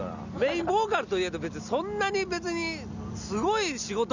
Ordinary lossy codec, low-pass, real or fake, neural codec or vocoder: none; 7.2 kHz; real; none